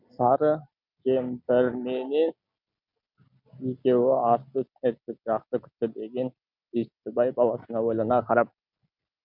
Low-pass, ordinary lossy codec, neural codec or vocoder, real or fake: 5.4 kHz; none; none; real